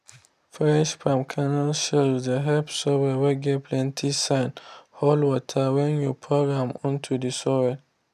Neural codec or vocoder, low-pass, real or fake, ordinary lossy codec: none; 14.4 kHz; real; none